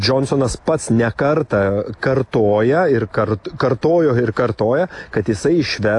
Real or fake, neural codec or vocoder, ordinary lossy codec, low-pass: real; none; AAC, 48 kbps; 10.8 kHz